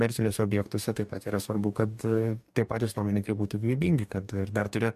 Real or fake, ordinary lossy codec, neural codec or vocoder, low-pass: fake; MP3, 96 kbps; codec, 44.1 kHz, 2.6 kbps, DAC; 14.4 kHz